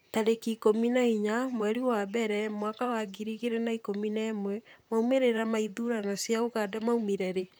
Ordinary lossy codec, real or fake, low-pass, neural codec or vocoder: none; fake; none; vocoder, 44.1 kHz, 128 mel bands, Pupu-Vocoder